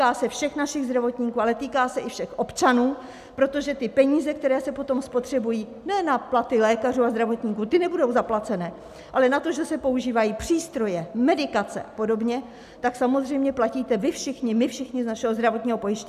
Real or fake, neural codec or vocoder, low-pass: real; none; 14.4 kHz